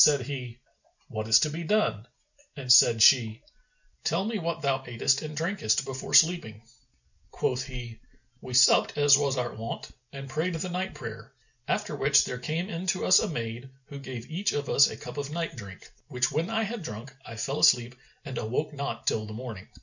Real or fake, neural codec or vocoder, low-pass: real; none; 7.2 kHz